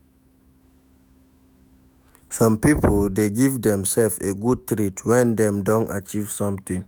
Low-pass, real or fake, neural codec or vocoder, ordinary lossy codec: none; fake; autoencoder, 48 kHz, 128 numbers a frame, DAC-VAE, trained on Japanese speech; none